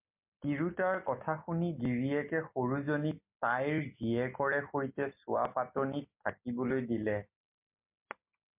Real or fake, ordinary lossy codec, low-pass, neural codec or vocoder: real; MP3, 32 kbps; 3.6 kHz; none